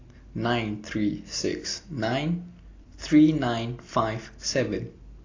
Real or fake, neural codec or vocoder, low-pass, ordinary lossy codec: real; none; 7.2 kHz; AAC, 32 kbps